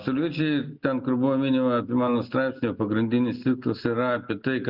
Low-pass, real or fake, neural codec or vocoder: 5.4 kHz; real; none